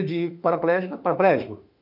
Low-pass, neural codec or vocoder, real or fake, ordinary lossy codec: 5.4 kHz; autoencoder, 48 kHz, 32 numbers a frame, DAC-VAE, trained on Japanese speech; fake; none